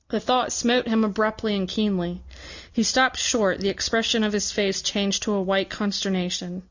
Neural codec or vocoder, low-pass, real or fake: none; 7.2 kHz; real